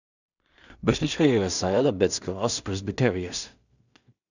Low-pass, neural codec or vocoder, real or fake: 7.2 kHz; codec, 16 kHz in and 24 kHz out, 0.4 kbps, LongCat-Audio-Codec, two codebook decoder; fake